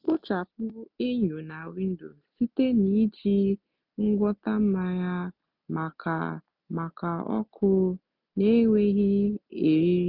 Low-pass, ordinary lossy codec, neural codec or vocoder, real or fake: 5.4 kHz; none; none; real